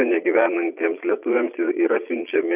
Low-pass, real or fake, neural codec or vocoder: 3.6 kHz; fake; vocoder, 22.05 kHz, 80 mel bands, Vocos